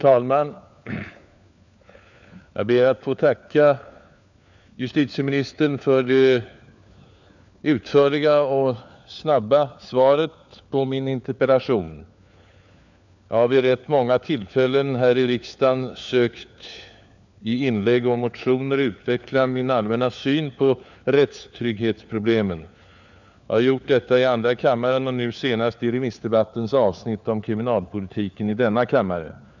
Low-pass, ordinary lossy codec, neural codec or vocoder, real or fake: 7.2 kHz; none; codec, 16 kHz, 4 kbps, FunCodec, trained on LibriTTS, 50 frames a second; fake